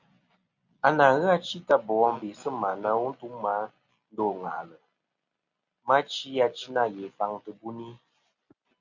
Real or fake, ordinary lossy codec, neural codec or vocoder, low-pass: real; Opus, 64 kbps; none; 7.2 kHz